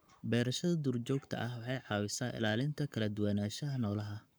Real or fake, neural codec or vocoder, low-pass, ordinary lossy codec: fake; codec, 44.1 kHz, 7.8 kbps, Pupu-Codec; none; none